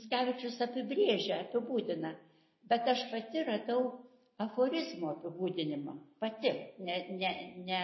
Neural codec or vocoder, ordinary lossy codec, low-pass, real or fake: none; MP3, 24 kbps; 7.2 kHz; real